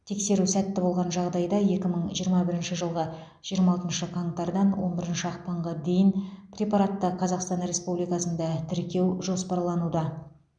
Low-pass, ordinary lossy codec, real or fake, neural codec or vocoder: 9.9 kHz; none; real; none